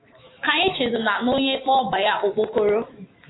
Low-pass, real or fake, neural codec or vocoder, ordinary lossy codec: 7.2 kHz; fake; autoencoder, 48 kHz, 128 numbers a frame, DAC-VAE, trained on Japanese speech; AAC, 16 kbps